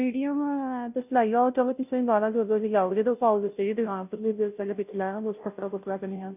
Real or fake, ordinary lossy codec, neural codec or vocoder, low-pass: fake; none; codec, 16 kHz, 0.5 kbps, FunCodec, trained on Chinese and English, 25 frames a second; 3.6 kHz